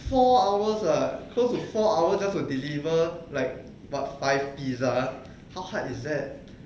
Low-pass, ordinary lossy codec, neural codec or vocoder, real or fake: none; none; none; real